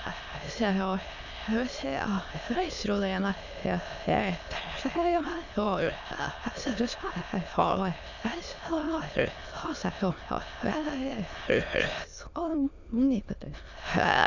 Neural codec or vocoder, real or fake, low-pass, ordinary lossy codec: autoencoder, 22.05 kHz, a latent of 192 numbers a frame, VITS, trained on many speakers; fake; 7.2 kHz; none